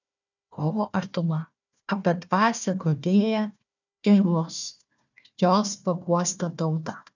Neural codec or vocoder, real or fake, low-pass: codec, 16 kHz, 1 kbps, FunCodec, trained on Chinese and English, 50 frames a second; fake; 7.2 kHz